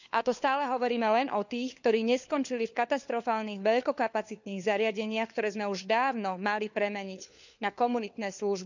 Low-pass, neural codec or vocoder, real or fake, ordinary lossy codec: 7.2 kHz; codec, 16 kHz, 4 kbps, FunCodec, trained on LibriTTS, 50 frames a second; fake; none